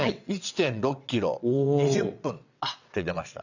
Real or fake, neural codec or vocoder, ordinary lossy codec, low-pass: fake; vocoder, 22.05 kHz, 80 mel bands, WaveNeXt; none; 7.2 kHz